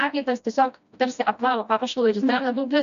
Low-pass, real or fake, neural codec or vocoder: 7.2 kHz; fake; codec, 16 kHz, 1 kbps, FreqCodec, smaller model